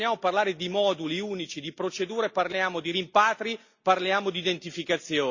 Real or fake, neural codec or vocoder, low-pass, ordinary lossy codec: real; none; 7.2 kHz; Opus, 64 kbps